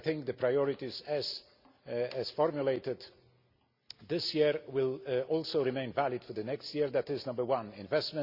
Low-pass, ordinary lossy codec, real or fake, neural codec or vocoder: 5.4 kHz; Opus, 64 kbps; real; none